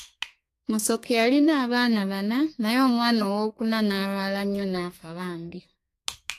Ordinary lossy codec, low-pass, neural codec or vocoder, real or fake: AAC, 64 kbps; 14.4 kHz; codec, 32 kHz, 1.9 kbps, SNAC; fake